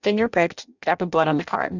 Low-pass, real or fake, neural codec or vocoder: 7.2 kHz; fake; codec, 16 kHz in and 24 kHz out, 0.6 kbps, FireRedTTS-2 codec